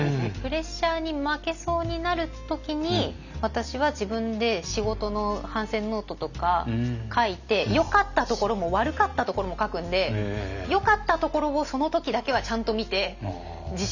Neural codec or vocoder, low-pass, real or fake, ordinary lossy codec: none; 7.2 kHz; real; none